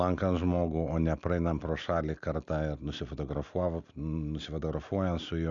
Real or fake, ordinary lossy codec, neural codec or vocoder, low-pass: real; Opus, 64 kbps; none; 7.2 kHz